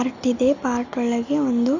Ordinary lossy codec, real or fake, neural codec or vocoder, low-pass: none; real; none; 7.2 kHz